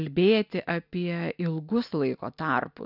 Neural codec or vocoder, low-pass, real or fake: none; 5.4 kHz; real